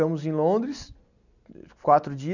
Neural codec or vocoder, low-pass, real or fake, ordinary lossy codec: none; 7.2 kHz; real; none